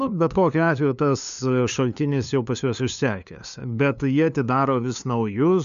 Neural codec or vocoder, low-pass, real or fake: codec, 16 kHz, 2 kbps, FunCodec, trained on LibriTTS, 25 frames a second; 7.2 kHz; fake